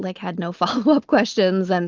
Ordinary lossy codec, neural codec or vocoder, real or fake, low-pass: Opus, 32 kbps; none; real; 7.2 kHz